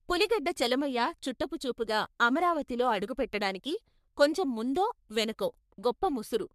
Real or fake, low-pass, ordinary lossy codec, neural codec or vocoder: fake; 14.4 kHz; MP3, 96 kbps; codec, 44.1 kHz, 3.4 kbps, Pupu-Codec